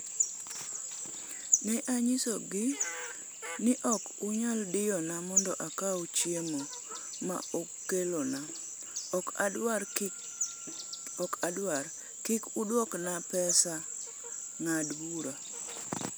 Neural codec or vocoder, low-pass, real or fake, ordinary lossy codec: none; none; real; none